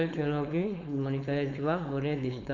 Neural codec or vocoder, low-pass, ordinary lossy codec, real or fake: codec, 16 kHz, 4.8 kbps, FACodec; 7.2 kHz; Opus, 64 kbps; fake